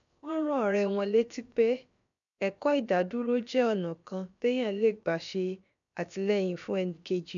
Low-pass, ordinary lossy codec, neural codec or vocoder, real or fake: 7.2 kHz; none; codec, 16 kHz, about 1 kbps, DyCAST, with the encoder's durations; fake